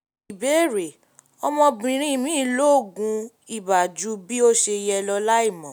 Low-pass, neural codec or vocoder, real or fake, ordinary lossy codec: none; none; real; none